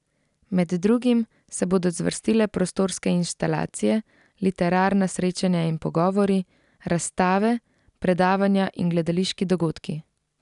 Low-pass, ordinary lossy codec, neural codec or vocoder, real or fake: 10.8 kHz; none; none; real